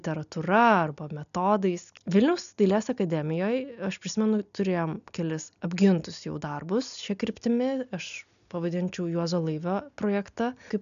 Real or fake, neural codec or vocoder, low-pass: real; none; 7.2 kHz